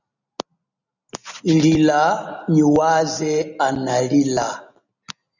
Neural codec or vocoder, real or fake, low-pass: none; real; 7.2 kHz